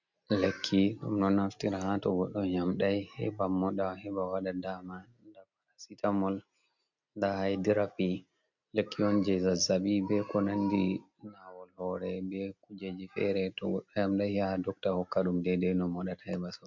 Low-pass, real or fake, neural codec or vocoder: 7.2 kHz; real; none